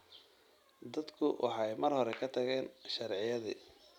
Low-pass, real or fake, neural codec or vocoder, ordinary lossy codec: 19.8 kHz; real; none; none